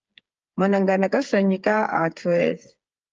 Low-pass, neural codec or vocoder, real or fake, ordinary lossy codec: 7.2 kHz; codec, 16 kHz, 8 kbps, FreqCodec, smaller model; fake; Opus, 24 kbps